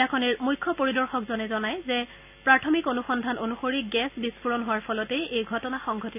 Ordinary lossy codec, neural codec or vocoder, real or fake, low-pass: none; none; real; 3.6 kHz